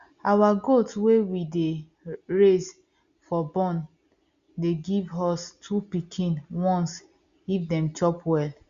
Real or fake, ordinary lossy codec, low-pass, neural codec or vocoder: real; Opus, 64 kbps; 7.2 kHz; none